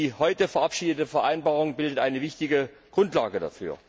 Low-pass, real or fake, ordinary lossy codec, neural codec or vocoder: none; real; none; none